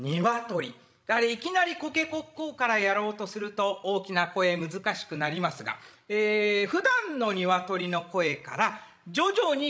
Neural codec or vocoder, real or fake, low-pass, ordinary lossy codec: codec, 16 kHz, 16 kbps, FreqCodec, larger model; fake; none; none